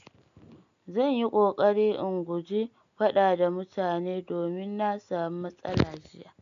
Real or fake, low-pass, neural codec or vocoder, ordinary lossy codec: real; 7.2 kHz; none; MP3, 64 kbps